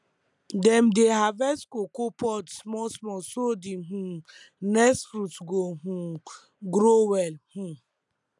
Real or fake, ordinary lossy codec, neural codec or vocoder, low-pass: real; none; none; 10.8 kHz